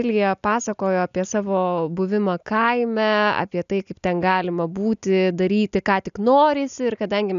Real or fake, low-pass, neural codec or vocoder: real; 7.2 kHz; none